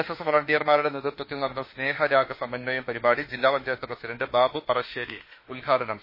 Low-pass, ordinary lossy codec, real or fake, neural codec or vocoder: 5.4 kHz; MP3, 24 kbps; fake; autoencoder, 48 kHz, 32 numbers a frame, DAC-VAE, trained on Japanese speech